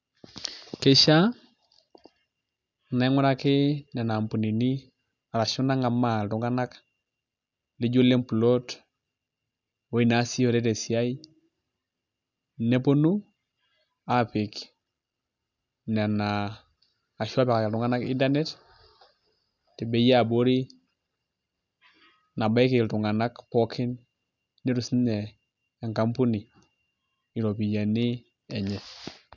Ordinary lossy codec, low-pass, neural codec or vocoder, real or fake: none; 7.2 kHz; none; real